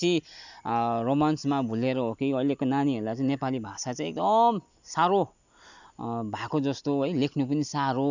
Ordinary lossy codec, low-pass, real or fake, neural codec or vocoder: none; 7.2 kHz; real; none